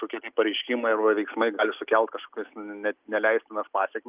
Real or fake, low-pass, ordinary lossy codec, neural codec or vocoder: real; 3.6 kHz; Opus, 24 kbps; none